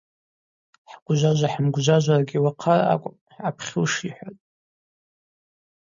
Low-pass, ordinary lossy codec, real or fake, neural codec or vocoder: 7.2 kHz; MP3, 64 kbps; real; none